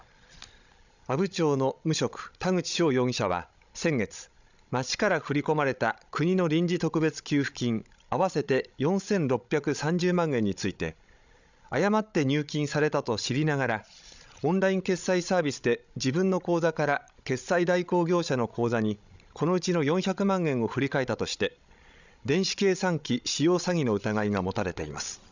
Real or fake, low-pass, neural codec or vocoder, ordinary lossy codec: fake; 7.2 kHz; codec, 16 kHz, 16 kbps, FreqCodec, larger model; none